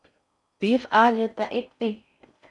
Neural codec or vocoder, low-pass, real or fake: codec, 16 kHz in and 24 kHz out, 0.6 kbps, FocalCodec, streaming, 4096 codes; 10.8 kHz; fake